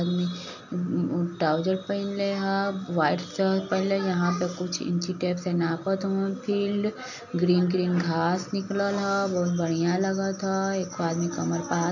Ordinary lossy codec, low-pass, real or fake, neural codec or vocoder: none; 7.2 kHz; real; none